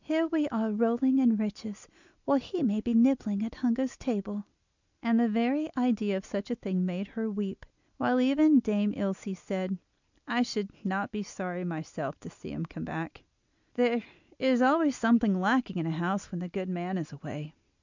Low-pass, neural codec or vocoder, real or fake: 7.2 kHz; none; real